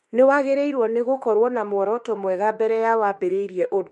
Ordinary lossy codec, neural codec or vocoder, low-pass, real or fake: MP3, 48 kbps; autoencoder, 48 kHz, 32 numbers a frame, DAC-VAE, trained on Japanese speech; 14.4 kHz; fake